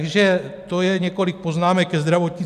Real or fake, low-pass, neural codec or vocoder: real; 14.4 kHz; none